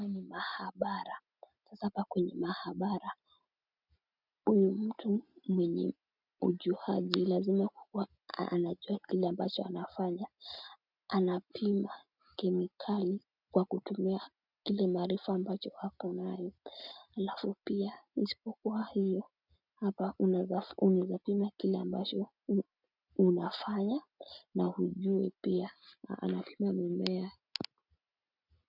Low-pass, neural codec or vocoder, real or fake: 5.4 kHz; none; real